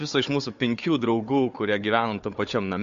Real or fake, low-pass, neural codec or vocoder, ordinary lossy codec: fake; 7.2 kHz; codec, 16 kHz, 4 kbps, FunCodec, trained on Chinese and English, 50 frames a second; MP3, 48 kbps